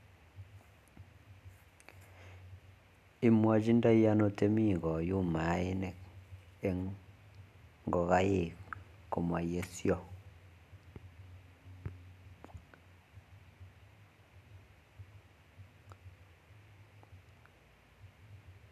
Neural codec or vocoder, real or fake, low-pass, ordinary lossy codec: none; real; 14.4 kHz; none